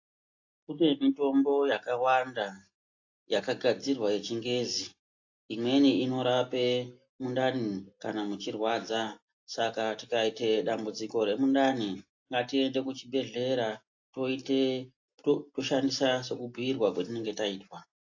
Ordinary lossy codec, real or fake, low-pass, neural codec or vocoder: AAC, 48 kbps; real; 7.2 kHz; none